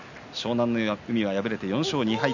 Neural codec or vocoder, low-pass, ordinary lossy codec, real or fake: none; 7.2 kHz; none; real